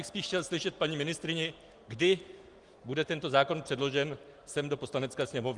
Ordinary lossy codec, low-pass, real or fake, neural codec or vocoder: Opus, 24 kbps; 10.8 kHz; real; none